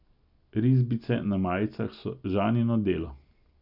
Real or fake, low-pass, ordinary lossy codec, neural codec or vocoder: real; 5.4 kHz; none; none